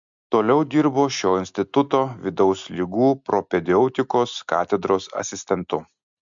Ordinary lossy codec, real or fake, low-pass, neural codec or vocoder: MP3, 64 kbps; real; 7.2 kHz; none